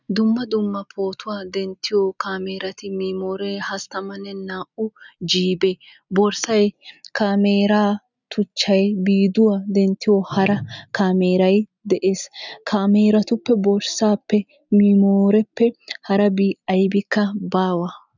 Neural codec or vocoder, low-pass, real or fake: none; 7.2 kHz; real